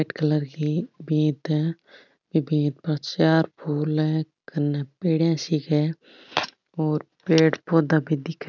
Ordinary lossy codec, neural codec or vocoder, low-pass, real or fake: none; none; 7.2 kHz; real